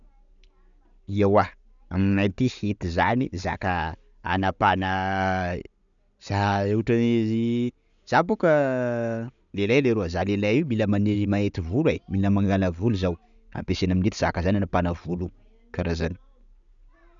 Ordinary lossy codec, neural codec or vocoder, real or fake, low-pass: none; none; real; 7.2 kHz